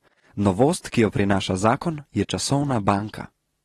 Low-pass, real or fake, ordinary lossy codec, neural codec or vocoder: 19.8 kHz; real; AAC, 32 kbps; none